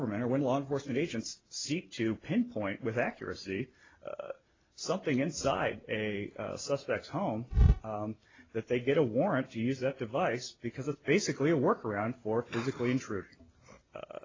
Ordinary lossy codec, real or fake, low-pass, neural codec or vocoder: AAC, 32 kbps; real; 7.2 kHz; none